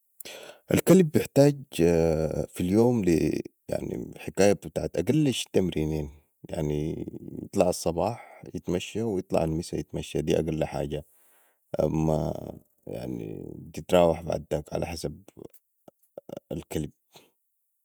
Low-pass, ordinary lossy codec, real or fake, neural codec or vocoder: none; none; fake; vocoder, 48 kHz, 128 mel bands, Vocos